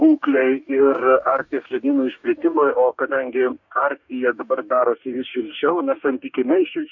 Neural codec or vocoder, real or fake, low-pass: codec, 44.1 kHz, 2.6 kbps, DAC; fake; 7.2 kHz